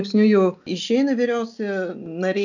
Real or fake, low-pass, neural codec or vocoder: real; 7.2 kHz; none